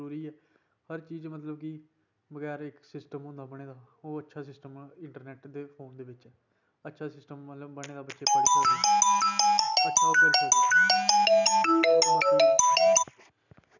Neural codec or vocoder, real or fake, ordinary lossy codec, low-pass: none; real; none; 7.2 kHz